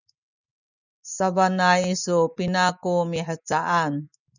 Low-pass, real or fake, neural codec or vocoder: 7.2 kHz; real; none